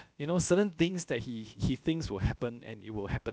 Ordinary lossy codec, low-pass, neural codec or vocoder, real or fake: none; none; codec, 16 kHz, about 1 kbps, DyCAST, with the encoder's durations; fake